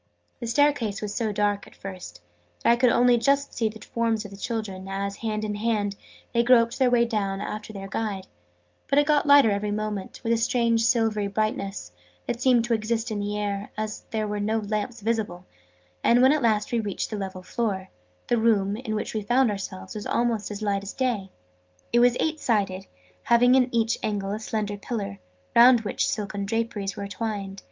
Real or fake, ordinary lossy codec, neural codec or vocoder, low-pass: real; Opus, 24 kbps; none; 7.2 kHz